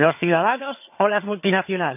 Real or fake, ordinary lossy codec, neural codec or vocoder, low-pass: fake; none; vocoder, 22.05 kHz, 80 mel bands, HiFi-GAN; 3.6 kHz